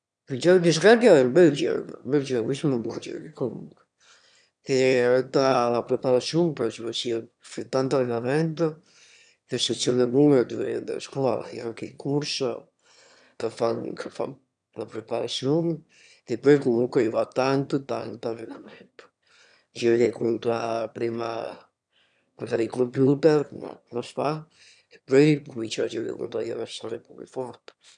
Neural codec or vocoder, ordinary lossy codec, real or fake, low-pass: autoencoder, 22.05 kHz, a latent of 192 numbers a frame, VITS, trained on one speaker; none; fake; 9.9 kHz